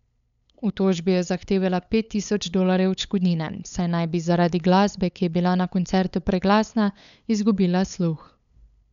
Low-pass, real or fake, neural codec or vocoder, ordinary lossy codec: 7.2 kHz; fake; codec, 16 kHz, 8 kbps, FunCodec, trained on LibriTTS, 25 frames a second; none